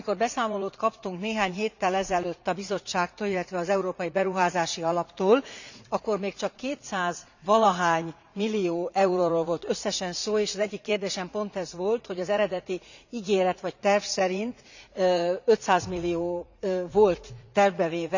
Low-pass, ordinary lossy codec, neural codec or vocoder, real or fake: 7.2 kHz; none; vocoder, 44.1 kHz, 80 mel bands, Vocos; fake